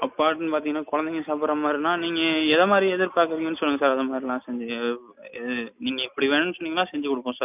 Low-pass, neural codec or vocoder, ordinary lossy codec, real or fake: 3.6 kHz; none; none; real